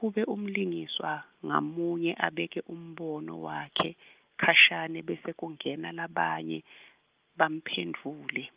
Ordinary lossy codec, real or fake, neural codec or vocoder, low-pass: Opus, 24 kbps; real; none; 3.6 kHz